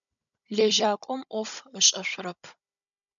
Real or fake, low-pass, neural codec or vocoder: fake; 7.2 kHz; codec, 16 kHz, 16 kbps, FunCodec, trained on Chinese and English, 50 frames a second